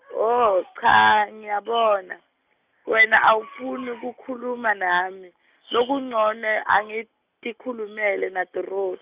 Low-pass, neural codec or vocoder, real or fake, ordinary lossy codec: 3.6 kHz; none; real; Opus, 64 kbps